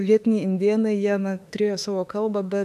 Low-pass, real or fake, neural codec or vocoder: 14.4 kHz; fake; autoencoder, 48 kHz, 32 numbers a frame, DAC-VAE, trained on Japanese speech